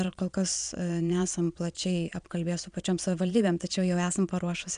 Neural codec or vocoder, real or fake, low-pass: vocoder, 22.05 kHz, 80 mel bands, Vocos; fake; 9.9 kHz